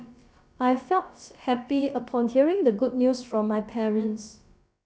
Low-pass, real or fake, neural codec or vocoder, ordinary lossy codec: none; fake; codec, 16 kHz, about 1 kbps, DyCAST, with the encoder's durations; none